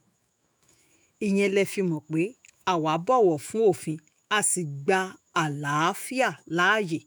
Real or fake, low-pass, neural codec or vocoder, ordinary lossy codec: fake; none; autoencoder, 48 kHz, 128 numbers a frame, DAC-VAE, trained on Japanese speech; none